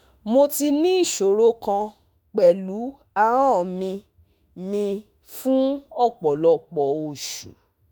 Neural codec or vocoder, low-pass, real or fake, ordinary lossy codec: autoencoder, 48 kHz, 32 numbers a frame, DAC-VAE, trained on Japanese speech; none; fake; none